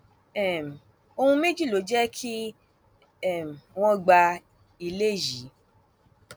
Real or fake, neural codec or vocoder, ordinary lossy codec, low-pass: real; none; none; none